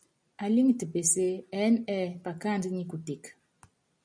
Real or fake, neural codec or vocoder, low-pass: real; none; 9.9 kHz